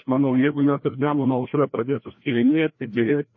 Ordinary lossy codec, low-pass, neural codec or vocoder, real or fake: MP3, 32 kbps; 7.2 kHz; codec, 16 kHz, 1 kbps, FreqCodec, larger model; fake